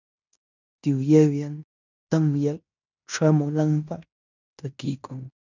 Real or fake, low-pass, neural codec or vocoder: fake; 7.2 kHz; codec, 16 kHz in and 24 kHz out, 0.9 kbps, LongCat-Audio-Codec, fine tuned four codebook decoder